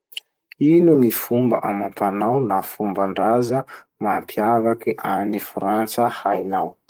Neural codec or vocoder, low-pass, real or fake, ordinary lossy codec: vocoder, 44.1 kHz, 128 mel bands, Pupu-Vocoder; 19.8 kHz; fake; Opus, 24 kbps